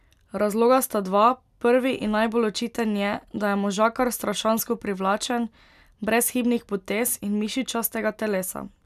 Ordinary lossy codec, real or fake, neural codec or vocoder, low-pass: none; real; none; 14.4 kHz